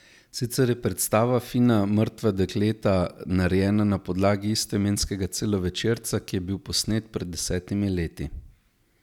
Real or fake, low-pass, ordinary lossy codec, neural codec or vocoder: real; 19.8 kHz; none; none